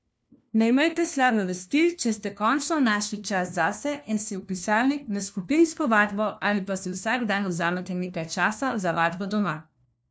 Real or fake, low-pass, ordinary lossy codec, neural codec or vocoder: fake; none; none; codec, 16 kHz, 1 kbps, FunCodec, trained on LibriTTS, 50 frames a second